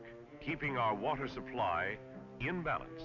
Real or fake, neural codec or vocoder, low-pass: real; none; 7.2 kHz